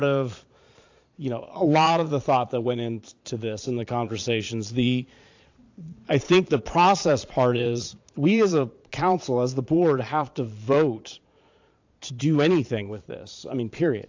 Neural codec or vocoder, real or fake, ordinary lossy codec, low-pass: vocoder, 22.05 kHz, 80 mel bands, Vocos; fake; AAC, 48 kbps; 7.2 kHz